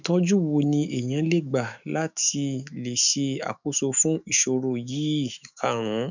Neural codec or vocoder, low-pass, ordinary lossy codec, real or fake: autoencoder, 48 kHz, 128 numbers a frame, DAC-VAE, trained on Japanese speech; 7.2 kHz; none; fake